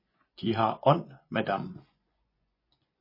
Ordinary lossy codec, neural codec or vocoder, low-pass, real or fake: MP3, 24 kbps; vocoder, 24 kHz, 100 mel bands, Vocos; 5.4 kHz; fake